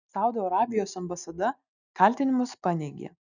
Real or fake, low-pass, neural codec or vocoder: real; 7.2 kHz; none